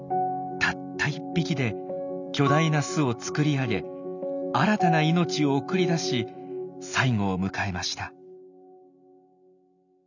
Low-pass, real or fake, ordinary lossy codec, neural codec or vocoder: 7.2 kHz; real; none; none